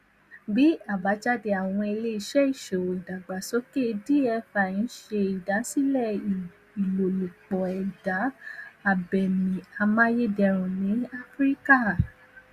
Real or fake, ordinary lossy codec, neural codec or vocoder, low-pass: real; Opus, 64 kbps; none; 14.4 kHz